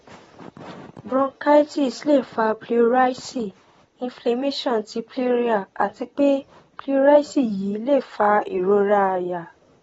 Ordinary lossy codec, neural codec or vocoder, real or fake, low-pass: AAC, 24 kbps; vocoder, 44.1 kHz, 128 mel bands, Pupu-Vocoder; fake; 19.8 kHz